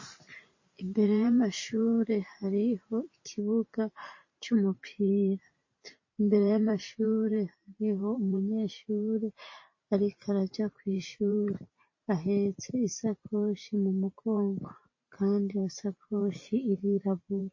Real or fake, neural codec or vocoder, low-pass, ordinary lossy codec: fake; vocoder, 44.1 kHz, 128 mel bands every 512 samples, BigVGAN v2; 7.2 kHz; MP3, 32 kbps